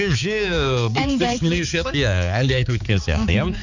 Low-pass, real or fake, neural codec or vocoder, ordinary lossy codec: 7.2 kHz; fake; codec, 16 kHz, 4 kbps, X-Codec, HuBERT features, trained on balanced general audio; none